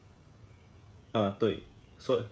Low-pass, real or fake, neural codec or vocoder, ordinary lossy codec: none; fake; codec, 16 kHz, 16 kbps, FreqCodec, smaller model; none